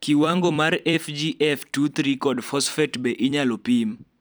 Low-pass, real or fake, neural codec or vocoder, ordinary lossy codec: none; fake; vocoder, 44.1 kHz, 128 mel bands every 256 samples, BigVGAN v2; none